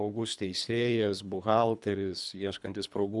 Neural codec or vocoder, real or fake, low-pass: codec, 24 kHz, 3 kbps, HILCodec; fake; 10.8 kHz